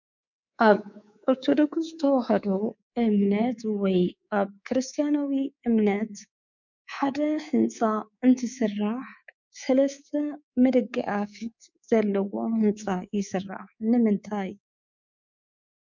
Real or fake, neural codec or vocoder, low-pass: fake; codec, 24 kHz, 3.1 kbps, DualCodec; 7.2 kHz